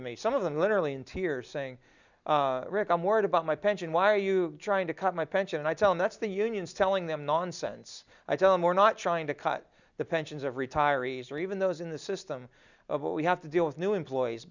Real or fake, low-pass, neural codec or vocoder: real; 7.2 kHz; none